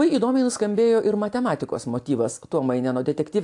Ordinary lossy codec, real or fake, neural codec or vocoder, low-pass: AAC, 64 kbps; real; none; 10.8 kHz